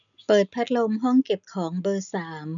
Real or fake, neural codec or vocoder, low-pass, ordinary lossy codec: real; none; 7.2 kHz; none